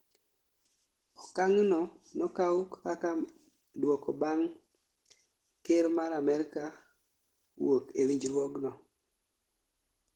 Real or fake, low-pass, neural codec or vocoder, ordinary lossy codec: real; 19.8 kHz; none; Opus, 16 kbps